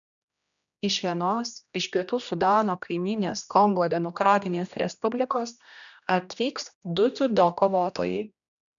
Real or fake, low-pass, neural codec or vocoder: fake; 7.2 kHz; codec, 16 kHz, 1 kbps, X-Codec, HuBERT features, trained on general audio